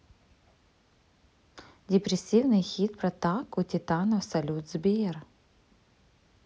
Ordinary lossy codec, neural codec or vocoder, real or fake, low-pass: none; none; real; none